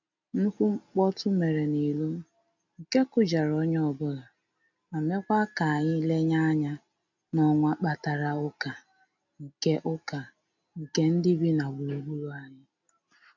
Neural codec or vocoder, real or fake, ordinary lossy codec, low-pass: none; real; none; 7.2 kHz